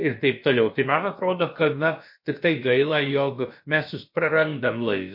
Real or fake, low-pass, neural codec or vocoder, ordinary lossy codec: fake; 5.4 kHz; codec, 16 kHz, about 1 kbps, DyCAST, with the encoder's durations; MP3, 32 kbps